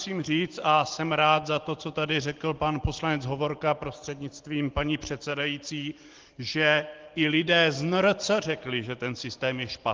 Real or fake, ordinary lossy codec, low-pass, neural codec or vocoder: real; Opus, 16 kbps; 7.2 kHz; none